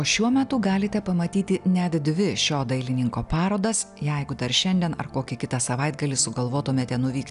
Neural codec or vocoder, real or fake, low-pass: none; real; 10.8 kHz